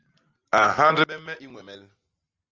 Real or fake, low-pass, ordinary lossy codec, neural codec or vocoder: real; 7.2 kHz; Opus, 32 kbps; none